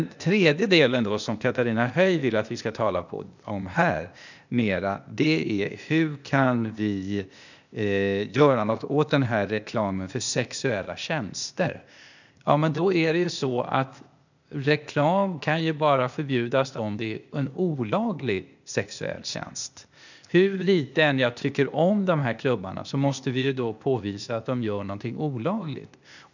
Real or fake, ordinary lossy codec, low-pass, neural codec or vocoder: fake; none; 7.2 kHz; codec, 16 kHz, 0.8 kbps, ZipCodec